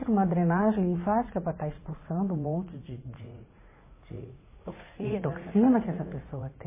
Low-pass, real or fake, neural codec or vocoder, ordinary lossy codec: 3.6 kHz; fake; vocoder, 44.1 kHz, 80 mel bands, Vocos; MP3, 16 kbps